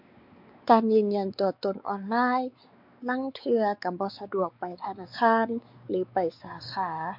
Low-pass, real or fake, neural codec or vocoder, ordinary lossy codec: 5.4 kHz; fake; codec, 16 kHz, 4 kbps, X-Codec, WavLM features, trained on Multilingual LibriSpeech; MP3, 48 kbps